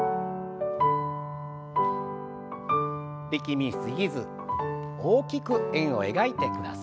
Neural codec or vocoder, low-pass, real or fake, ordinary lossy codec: none; none; real; none